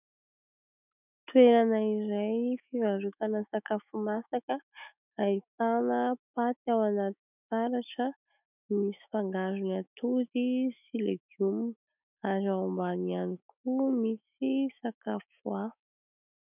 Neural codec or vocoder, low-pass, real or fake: autoencoder, 48 kHz, 128 numbers a frame, DAC-VAE, trained on Japanese speech; 3.6 kHz; fake